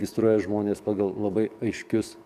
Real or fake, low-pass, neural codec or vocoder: fake; 14.4 kHz; codec, 44.1 kHz, 7.8 kbps, DAC